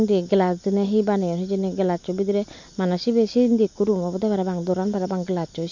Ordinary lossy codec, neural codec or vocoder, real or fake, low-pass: MP3, 48 kbps; none; real; 7.2 kHz